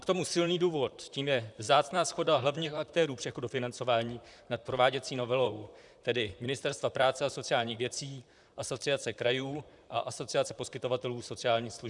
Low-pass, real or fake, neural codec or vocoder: 10.8 kHz; fake; vocoder, 44.1 kHz, 128 mel bands, Pupu-Vocoder